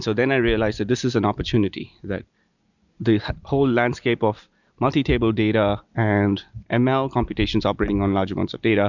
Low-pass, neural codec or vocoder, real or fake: 7.2 kHz; none; real